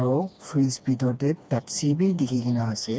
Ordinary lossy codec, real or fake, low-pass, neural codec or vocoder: none; fake; none; codec, 16 kHz, 2 kbps, FreqCodec, smaller model